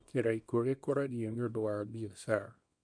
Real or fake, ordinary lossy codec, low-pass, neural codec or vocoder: fake; none; 9.9 kHz; codec, 24 kHz, 0.9 kbps, WavTokenizer, small release